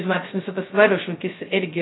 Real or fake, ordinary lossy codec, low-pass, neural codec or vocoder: fake; AAC, 16 kbps; 7.2 kHz; codec, 16 kHz, 0.2 kbps, FocalCodec